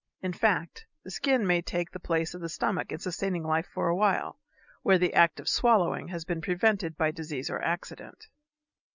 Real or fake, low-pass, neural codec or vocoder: real; 7.2 kHz; none